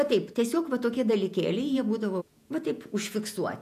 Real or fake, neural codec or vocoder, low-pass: fake; vocoder, 48 kHz, 128 mel bands, Vocos; 14.4 kHz